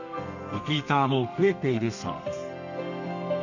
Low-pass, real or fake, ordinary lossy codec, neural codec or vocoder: 7.2 kHz; fake; none; codec, 32 kHz, 1.9 kbps, SNAC